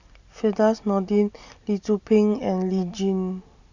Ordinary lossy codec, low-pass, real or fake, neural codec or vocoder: none; 7.2 kHz; real; none